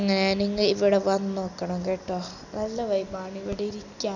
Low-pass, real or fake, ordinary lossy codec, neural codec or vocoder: 7.2 kHz; real; none; none